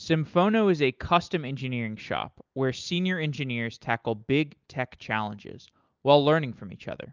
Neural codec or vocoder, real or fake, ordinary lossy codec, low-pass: none; real; Opus, 24 kbps; 7.2 kHz